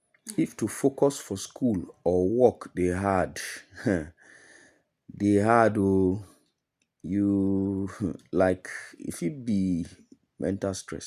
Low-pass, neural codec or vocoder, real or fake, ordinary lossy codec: 14.4 kHz; none; real; none